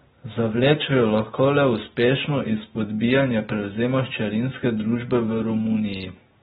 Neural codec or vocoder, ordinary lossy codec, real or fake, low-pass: none; AAC, 16 kbps; real; 19.8 kHz